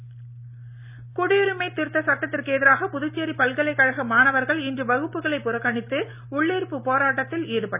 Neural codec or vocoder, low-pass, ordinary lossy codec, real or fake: none; 3.6 kHz; none; real